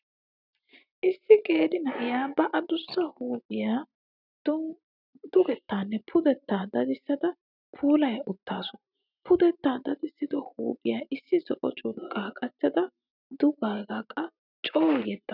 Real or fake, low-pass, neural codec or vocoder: real; 5.4 kHz; none